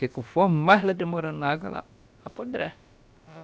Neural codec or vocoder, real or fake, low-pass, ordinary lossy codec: codec, 16 kHz, about 1 kbps, DyCAST, with the encoder's durations; fake; none; none